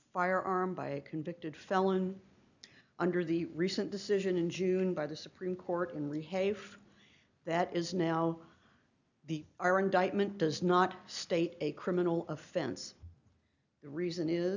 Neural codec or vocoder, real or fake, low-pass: none; real; 7.2 kHz